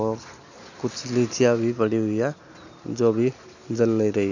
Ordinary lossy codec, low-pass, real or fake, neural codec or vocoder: none; 7.2 kHz; real; none